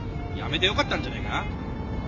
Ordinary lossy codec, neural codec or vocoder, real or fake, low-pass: AAC, 48 kbps; none; real; 7.2 kHz